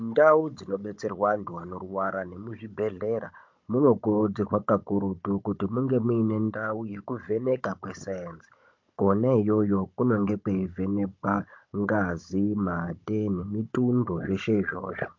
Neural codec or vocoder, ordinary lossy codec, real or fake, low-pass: codec, 16 kHz, 16 kbps, FunCodec, trained on Chinese and English, 50 frames a second; MP3, 48 kbps; fake; 7.2 kHz